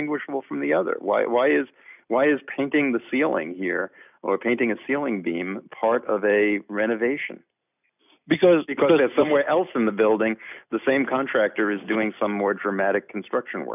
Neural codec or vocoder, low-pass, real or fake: none; 3.6 kHz; real